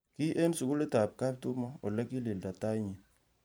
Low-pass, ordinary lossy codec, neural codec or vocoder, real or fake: none; none; vocoder, 44.1 kHz, 128 mel bands every 512 samples, BigVGAN v2; fake